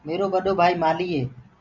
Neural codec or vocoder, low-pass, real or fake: none; 7.2 kHz; real